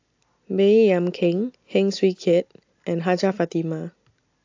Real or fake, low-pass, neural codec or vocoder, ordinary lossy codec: real; 7.2 kHz; none; AAC, 48 kbps